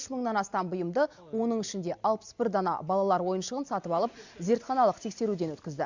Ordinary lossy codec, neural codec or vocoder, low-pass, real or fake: Opus, 64 kbps; none; 7.2 kHz; real